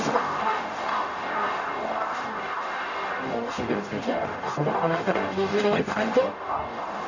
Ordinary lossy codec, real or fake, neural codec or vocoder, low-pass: none; fake; codec, 44.1 kHz, 0.9 kbps, DAC; 7.2 kHz